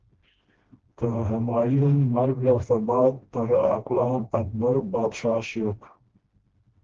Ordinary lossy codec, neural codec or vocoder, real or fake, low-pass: Opus, 16 kbps; codec, 16 kHz, 1 kbps, FreqCodec, smaller model; fake; 7.2 kHz